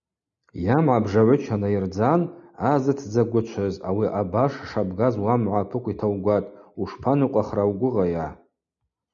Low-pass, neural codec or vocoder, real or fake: 7.2 kHz; none; real